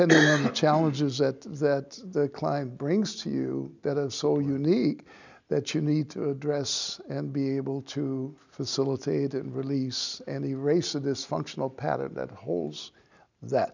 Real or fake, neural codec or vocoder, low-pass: real; none; 7.2 kHz